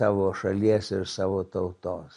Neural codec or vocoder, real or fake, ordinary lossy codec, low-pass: none; real; MP3, 48 kbps; 14.4 kHz